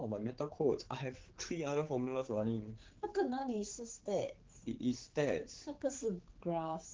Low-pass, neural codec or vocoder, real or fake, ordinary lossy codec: 7.2 kHz; codec, 16 kHz, 4 kbps, X-Codec, HuBERT features, trained on general audio; fake; Opus, 16 kbps